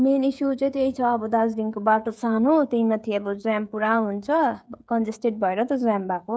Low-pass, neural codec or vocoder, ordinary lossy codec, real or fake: none; codec, 16 kHz, 16 kbps, FreqCodec, smaller model; none; fake